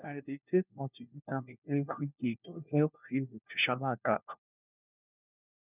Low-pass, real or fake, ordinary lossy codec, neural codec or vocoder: 3.6 kHz; fake; none; codec, 16 kHz, 1 kbps, FunCodec, trained on LibriTTS, 50 frames a second